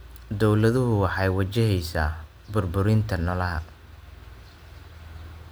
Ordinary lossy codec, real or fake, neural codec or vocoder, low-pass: none; real; none; none